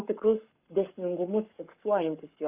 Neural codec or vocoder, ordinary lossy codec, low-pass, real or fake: codec, 44.1 kHz, 7.8 kbps, Pupu-Codec; Opus, 64 kbps; 3.6 kHz; fake